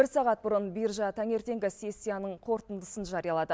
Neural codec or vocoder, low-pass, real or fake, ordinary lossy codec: none; none; real; none